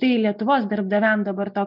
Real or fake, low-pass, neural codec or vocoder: real; 5.4 kHz; none